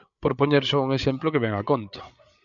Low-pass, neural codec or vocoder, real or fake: 7.2 kHz; codec, 16 kHz, 8 kbps, FreqCodec, larger model; fake